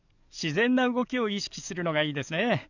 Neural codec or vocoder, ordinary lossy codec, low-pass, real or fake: codec, 44.1 kHz, 7.8 kbps, DAC; none; 7.2 kHz; fake